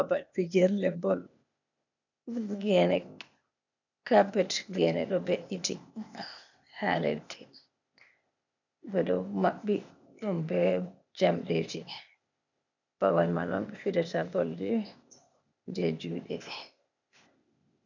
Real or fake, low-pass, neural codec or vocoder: fake; 7.2 kHz; codec, 16 kHz, 0.8 kbps, ZipCodec